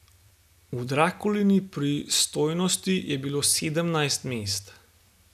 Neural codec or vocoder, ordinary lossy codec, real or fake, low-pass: none; none; real; 14.4 kHz